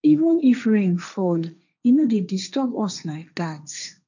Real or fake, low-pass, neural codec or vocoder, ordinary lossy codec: fake; 7.2 kHz; codec, 16 kHz, 1.1 kbps, Voila-Tokenizer; none